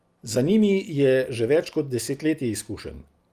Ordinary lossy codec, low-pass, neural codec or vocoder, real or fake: Opus, 32 kbps; 14.4 kHz; none; real